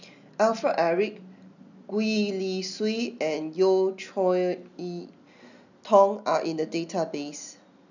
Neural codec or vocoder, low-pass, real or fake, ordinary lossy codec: none; 7.2 kHz; real; none